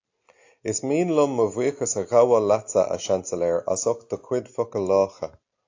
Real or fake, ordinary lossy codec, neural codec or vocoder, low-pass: real; AAC, 48 kbps; none; 7.2 kHz